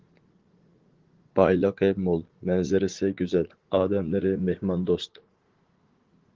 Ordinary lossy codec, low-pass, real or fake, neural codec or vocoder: Opus, 16 kbps; 7.2 kHz; fake; vocoder, 44.1 kHz, 80 mel bands, Vocos